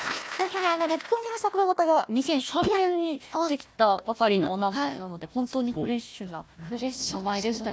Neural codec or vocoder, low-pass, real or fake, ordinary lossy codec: codec, 16 kHz, 1 kbps, FunCodec, trained on Chinese and English, 50 frames a second; none; fake; none